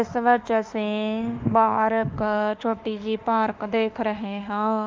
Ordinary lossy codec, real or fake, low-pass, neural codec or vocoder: Opus, 24 kbps; fake; 7.2 kHz; autoencoder, 48 kHz, 32 numbers a frame, DAC-VAE, trained on Japanese speech